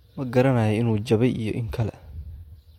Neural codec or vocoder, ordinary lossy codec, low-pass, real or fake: none; MP3, 64 kbps; 19.8 kHz; real